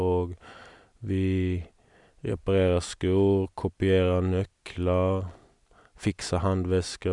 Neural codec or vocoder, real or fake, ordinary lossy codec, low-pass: none; real; none; 10.8 kHz